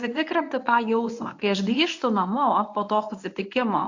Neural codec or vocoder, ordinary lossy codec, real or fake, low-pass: codec, 24 kHz, 0.9 kbps, WavTokenizer, medium speech release version 1; AAC, 48 kbps; fake; 7.2 kHz